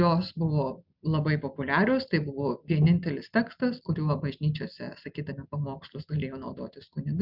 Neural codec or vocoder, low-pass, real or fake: none; 5.4 kHz; real